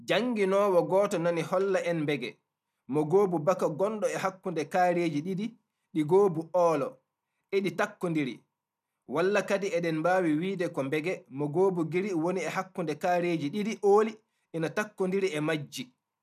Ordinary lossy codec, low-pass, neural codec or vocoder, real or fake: none; 14.4 kHz; none; real